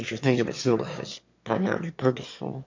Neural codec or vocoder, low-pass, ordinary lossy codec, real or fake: autoencoder, 22.05 kHz, a latent of 192 numbers a frame, VITS, trained on one speaker; 7.2 kHz; MP3, 48 kbps; fake